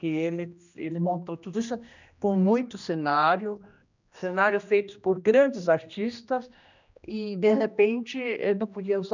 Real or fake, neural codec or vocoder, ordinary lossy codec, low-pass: fake; codec, 16 kHz, 1 kbps, X-Codec, HuBERT features, trained on general audio; none; 7.2 kHz